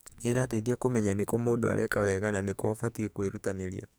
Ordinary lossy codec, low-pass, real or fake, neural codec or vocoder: none; none; fake; codec, 44.1 kHz, 2.6 kbps, SNAC